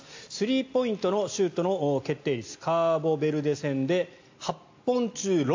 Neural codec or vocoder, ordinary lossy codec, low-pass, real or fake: none; AAC, 48 kbps; 7.2 kHz; real